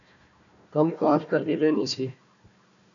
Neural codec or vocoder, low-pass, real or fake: codec, 16 kHz, 1 kbps, FunCodec, trained on Chinese and English, 50 frames a second; 7.2 kHz; fake